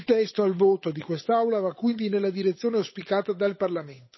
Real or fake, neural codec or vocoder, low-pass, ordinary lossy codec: fake; codec, 16 kHz, 8 kbps, FunCodec, trained on Chinese and English, 25 frames a second; 7.2 kHz; MP3, 24 kbps